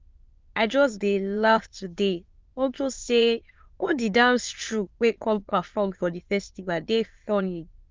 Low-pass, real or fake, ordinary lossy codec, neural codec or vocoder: 7.2 kHz; fake; Opus, 24 kbps; autoencoder, 22.05 kHz, a latent of 192 numbers a frame, VITS, trained on many speakers